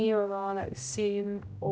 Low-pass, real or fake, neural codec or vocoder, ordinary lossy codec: none; fake; codec, 16 kHz, 0.5 kbps, X-Codec, HuBERT features, trained on general audio; none